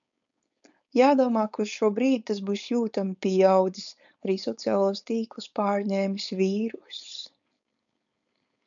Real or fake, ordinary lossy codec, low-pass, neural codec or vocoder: fake; MP3, 96 kbps; 7.2 kHz; codec, 16 kHz, 4.8 kbps, FACodec